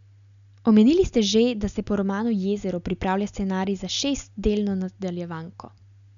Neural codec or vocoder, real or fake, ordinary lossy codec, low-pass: none; real; none; 7.2 kHz